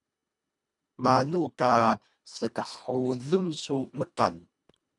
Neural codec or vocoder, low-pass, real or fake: codec, 24 kHz, 1.5 kbps, HILCodec; 10.8 kHz; fake